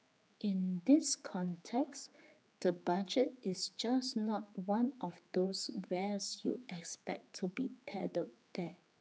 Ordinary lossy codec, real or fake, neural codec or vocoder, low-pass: none; fake; codec, 16 kHz, 4 kbps, X-Codec, HuBERT features, trained on general audio; none